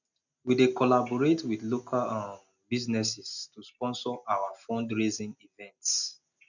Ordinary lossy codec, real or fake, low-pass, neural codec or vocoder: none; real; 7.2 kHz; none